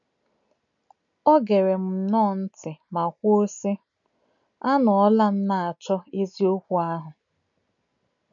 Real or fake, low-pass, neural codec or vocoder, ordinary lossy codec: real; 7.2 kHz; none; none